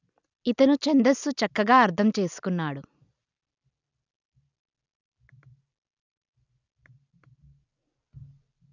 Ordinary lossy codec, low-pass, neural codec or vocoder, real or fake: none; 7.2 kHz; none; real